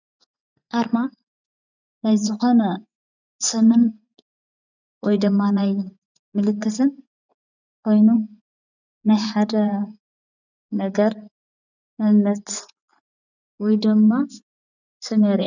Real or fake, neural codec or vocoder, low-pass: fake; vocoder, 24 kHz, 100 mel bands, Vocos; 7.2 kHz